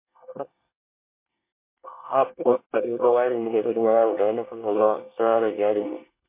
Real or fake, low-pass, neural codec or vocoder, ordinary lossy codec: fake; 3.6 kHz; codec, 24 kHz, 1 kbps, SNAC; MP3, 24 kbps